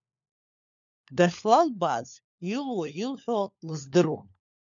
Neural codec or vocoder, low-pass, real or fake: codec, 16 kHz, 4 kbps, FunCodec, trained on LibriTTS, 50 frames a second; 7.2 kHz; fake